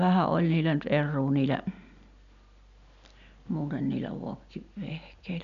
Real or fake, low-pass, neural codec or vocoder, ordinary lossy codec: real; 7.2 kHz; none; none